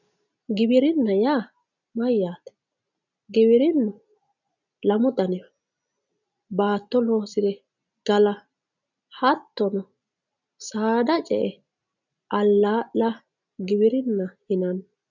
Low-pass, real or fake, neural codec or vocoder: 7.2 kHz; real; none